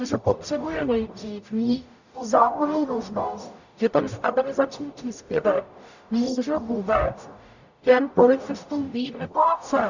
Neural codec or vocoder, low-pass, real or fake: codec, 44.1 kHz, 0.9 kbps, DAC; 7.2 kHz; fake